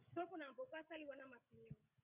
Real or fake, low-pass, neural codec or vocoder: fake; 3.6 kHz; codec, 16 kHz, 16 kbps, FreqCodec, smaller model